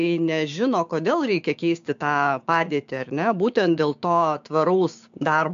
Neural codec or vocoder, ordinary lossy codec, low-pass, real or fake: codec, 16 kHz, 6 kbps, DAC; AAC, 64 kbps; 7.2 kHz; fake